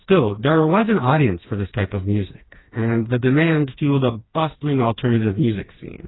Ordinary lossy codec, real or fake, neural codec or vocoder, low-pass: AAC, 16 kbps; fake; codec, 16 kHz, 2 kbps, FreqCodec, smaller model; 7.2 kHz